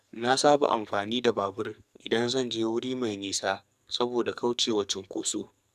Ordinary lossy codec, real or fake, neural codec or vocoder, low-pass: none; fake; codec, 44.1 kHz, 2.6 kbps, SNAC; 14.4 kHz